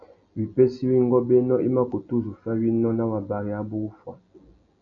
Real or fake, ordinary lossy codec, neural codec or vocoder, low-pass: real; AAC, 48 kbps; none; 7.2 kHz